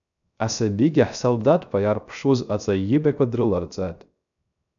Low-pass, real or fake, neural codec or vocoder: 7.2 kHz; fake; codec, 16 kHz, 0.3 kbps, FocalCodec